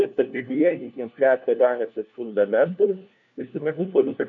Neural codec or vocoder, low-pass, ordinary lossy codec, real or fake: codec, 16 kHz, 1 kbps, FunCodec, trained on LibriTTS, 50 frames a second; 7.2 kHz; MP3, 64 kbps; fake